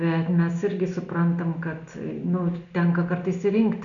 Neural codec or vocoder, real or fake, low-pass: none; real; 7.2 kHz